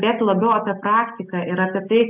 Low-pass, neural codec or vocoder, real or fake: 3.6 kHz; none; real